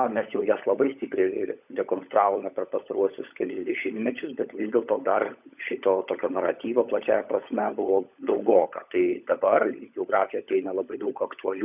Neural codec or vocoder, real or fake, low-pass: codec, 16 kHz, 16 kbps, FunCodec, trained on LibriTTS, 50 frames a second; fake; 3.6 kHz